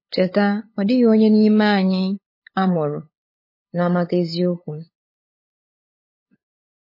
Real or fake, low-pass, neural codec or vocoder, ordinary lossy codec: fake; 5.4 kHz; codec, 16 kHz, 8 kbps, FunCodec, trained on LibriTTS, 25 frames a second; MP3, 24 kbps